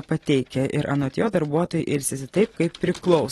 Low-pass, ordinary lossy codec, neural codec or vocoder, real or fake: 19.8 kHz; AAC, 32 kbps; none; real